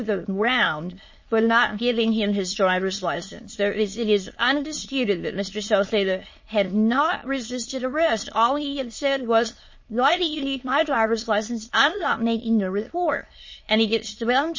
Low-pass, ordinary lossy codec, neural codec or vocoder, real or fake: 7.2 kHz; MP3, 32 kbps; autoencoder, 22.05 kHz, a latent of 192 numbers a frame, VITS, trained on many speakers; fake